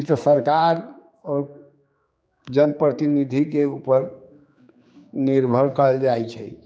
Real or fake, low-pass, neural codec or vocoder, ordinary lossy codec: fake; none; codec, 16 kHz, 4 kbps, X-Codec, HuBERT features, trained on general audio; none